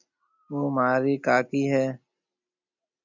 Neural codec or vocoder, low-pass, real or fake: none; 7.2 kHz; real